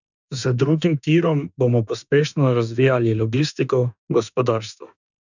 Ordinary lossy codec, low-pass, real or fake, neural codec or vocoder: none; 7.2 kHz; fake; autoencoder, 48 kHz, 32 numbers a frame, DAC-VAE, trained on Japanese speech